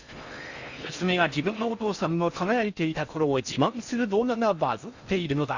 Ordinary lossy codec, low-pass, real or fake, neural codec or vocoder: Opus, 64 kbps; 7.2 kHz; fake; codec, 16 kHz in and 24 kHz out, 0.6 kbps, FocalCodec, streaming, 4096 codes